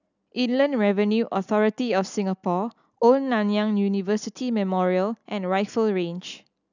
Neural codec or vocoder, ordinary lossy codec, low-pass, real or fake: none; none; 7.2 kHz; real